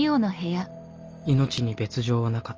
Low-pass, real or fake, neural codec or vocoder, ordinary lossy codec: 7.2 kHz; real; none; Opus, 24 kbps